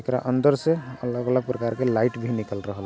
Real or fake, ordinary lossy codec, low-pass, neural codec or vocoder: real; none; none; none